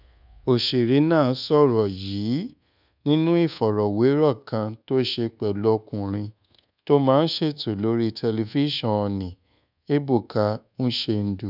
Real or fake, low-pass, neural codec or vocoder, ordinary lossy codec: fake; 5.4 kHz; codec, 24 kHz, 1.2 kbps, DualCodec; none